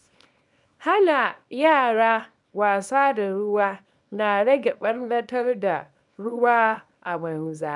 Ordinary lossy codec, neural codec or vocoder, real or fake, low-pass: none; codec, 24 kHz, 0.9 kbps, WavTokenizer, small release; fake; 10.8 kHz